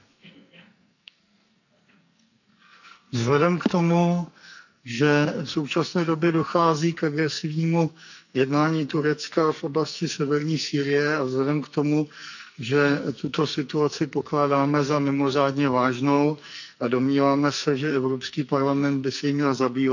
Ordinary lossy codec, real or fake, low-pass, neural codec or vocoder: none; fake; 7.2 kHz; codec, 44.1 kHz, 2.6 kbps, SNAC